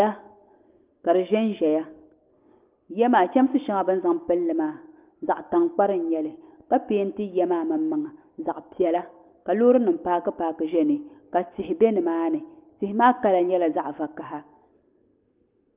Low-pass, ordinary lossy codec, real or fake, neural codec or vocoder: 3.6 kHz; Opus, 32 kbps; real; none